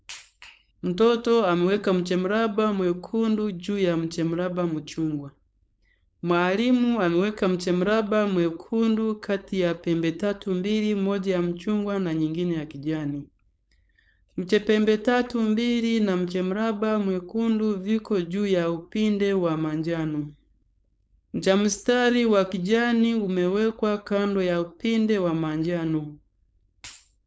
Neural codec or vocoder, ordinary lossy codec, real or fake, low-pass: codec, 16 kHz, 4.8 kbps, FACodec; none; fake; none